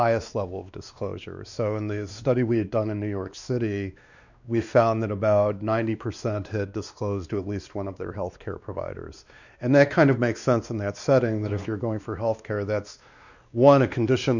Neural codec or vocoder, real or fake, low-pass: codec, 16 kHz, 2 kbps, X-Codec, WavLM features, trained on Multilingual LibriSpeech; fake; 7.2 kHz